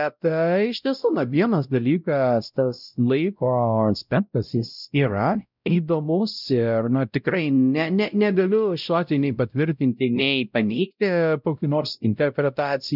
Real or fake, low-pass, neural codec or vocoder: fake; 5.4 kHz; codec, 16 kHz, 0.5 kbps, X-Codec, WavLM features, trained on Multilingual LibriSpeech